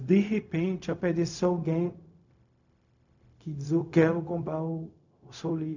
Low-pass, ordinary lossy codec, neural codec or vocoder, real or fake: 7.2 kHz; none; codec, 16 kHz, 0.4 kbps, LongCat-Audio-Codec; fake